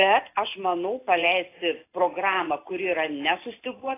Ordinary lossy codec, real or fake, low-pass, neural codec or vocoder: AAC, 24 kbps; real; 3.6 kHz; none